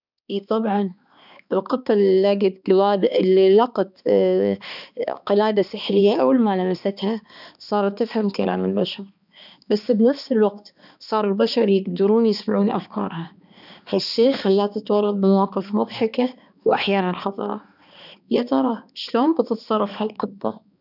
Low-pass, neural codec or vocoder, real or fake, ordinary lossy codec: 5.4 kHz; codec, 16 kHz, 2 kbps, X-Codec, HuBERT features, trained on balanced general audio; fake; none